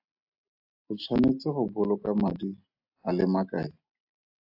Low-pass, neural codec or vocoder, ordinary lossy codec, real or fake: 5.4 kHz; none; AAC, 48 kbps; real